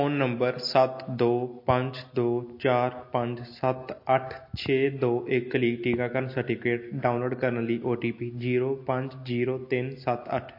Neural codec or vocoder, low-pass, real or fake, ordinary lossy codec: none; 5.4 kHz; real; MP3, 32 kbps